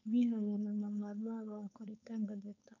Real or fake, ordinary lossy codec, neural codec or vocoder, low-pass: fake; none; codec, 16 kHz, 4.8 kbps, FACodec; 7.2 kHz